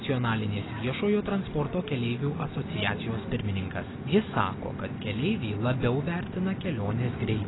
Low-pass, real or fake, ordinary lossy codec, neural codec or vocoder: 7.2 kHz; real; AAC, 16 kbps; none